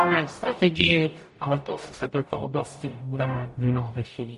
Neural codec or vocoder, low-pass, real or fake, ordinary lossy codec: codec, 44.1 kHz, 0.9 kbps, DAC; 14.4 kHz; fake; MP3, 48 kbps